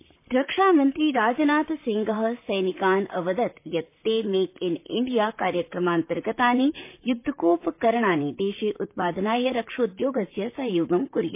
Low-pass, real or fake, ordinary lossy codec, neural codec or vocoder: 3.6 kHz; fake; MP3, 24 kbps; vocoder, 44.1 kHz, 128 mel bands, Pupu-Vocoder